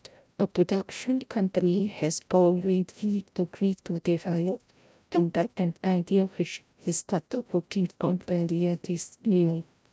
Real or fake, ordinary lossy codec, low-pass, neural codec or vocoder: fake; none; none; codec, 16 kHz, 0.5 kbps, FreqCodec, larger model